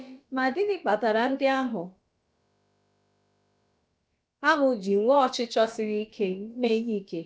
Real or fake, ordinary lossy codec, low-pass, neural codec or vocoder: fake; none; none; codec, 16 kHz, about 1 kbps, DyCAST, with the encoder's durations